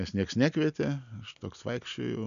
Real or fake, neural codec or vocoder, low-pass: real; none; 7.2 kHz